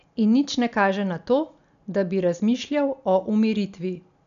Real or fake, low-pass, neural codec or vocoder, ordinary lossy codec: real; 7.2 kHz; none; MP3, 96 kbps